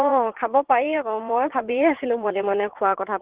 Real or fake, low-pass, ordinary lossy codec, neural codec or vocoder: fake; 3.6 kHz; Opus, 16 kbps; vocoder, 44.1 kHz, 80 mel bands, Vocos